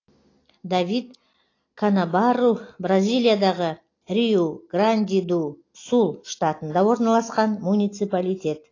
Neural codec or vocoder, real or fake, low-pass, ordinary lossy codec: none; real; 7.2 kHz; AAC, 32 kbps